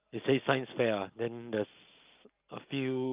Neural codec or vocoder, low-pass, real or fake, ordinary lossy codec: none; 3.6 kHz; real; Opus, 24 kbps